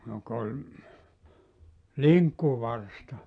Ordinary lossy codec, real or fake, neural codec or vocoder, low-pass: MP3, 96 kbps; real; none; 9.9 kHz